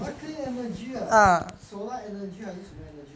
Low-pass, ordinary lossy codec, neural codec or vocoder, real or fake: none; none; none; real